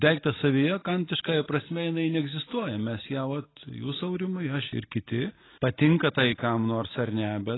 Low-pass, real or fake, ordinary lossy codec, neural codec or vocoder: 7.2 kHz; real; AAC, 16 kbps; none